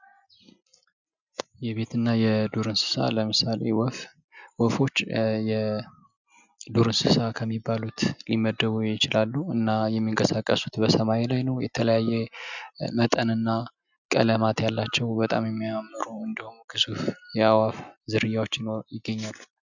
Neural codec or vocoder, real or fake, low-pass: none; real; 7.2 kHz